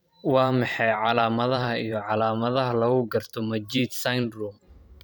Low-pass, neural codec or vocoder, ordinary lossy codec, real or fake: none; vocoder, 44.1 kHz, 128 mel bands every 512 samples, BigVGAN v2; none; fake